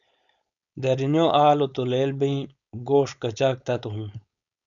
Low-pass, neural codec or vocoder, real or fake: 7.2 kHz; codec, 16 kHz, 4.8 kbps, FACodec; fake